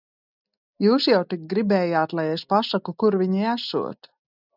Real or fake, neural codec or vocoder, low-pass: real; none; 5.4 kHz